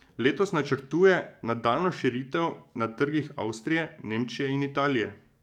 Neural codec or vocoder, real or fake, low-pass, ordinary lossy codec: codec, 44.1 kHz, 7.8 kbps, DAC; fake; 19.8 kHz; none